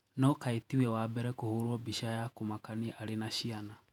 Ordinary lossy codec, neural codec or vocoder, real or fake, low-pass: none; vocoder, 48 kHz, 128 mel bands, Vocos; fake; 19.8 kHz